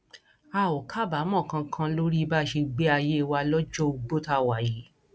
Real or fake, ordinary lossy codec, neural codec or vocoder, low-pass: real; none; none; none